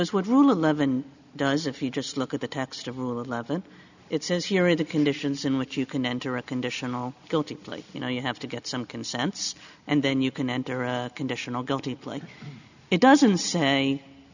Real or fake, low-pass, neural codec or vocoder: real; 7.2 kHz; none